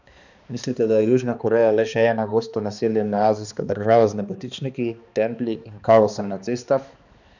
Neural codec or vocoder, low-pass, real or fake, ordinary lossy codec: codec, 16 kHz, 2 kbps, X-Codec, HuBERT features, trained on balanced general audio; 7.2 kHz; fake; none